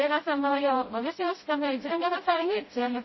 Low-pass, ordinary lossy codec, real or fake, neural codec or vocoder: 7.2 kHz; MP3, 24 kbps; fake; codec, 16 kHz, 0.5 kbps, FreqCodec, smaller model